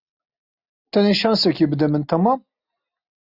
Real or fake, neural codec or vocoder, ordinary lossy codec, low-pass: real; none; Opus, 64 kbps; 5.4 kHz